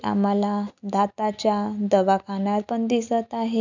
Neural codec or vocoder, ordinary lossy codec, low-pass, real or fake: none; none; 7.2 kHz; real